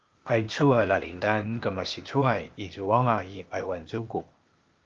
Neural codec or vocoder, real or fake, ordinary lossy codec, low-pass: codec, 16 kHz, 0.8 kbps, ZipCodec; fake; Opus, 32 kbps; 7.2 kHz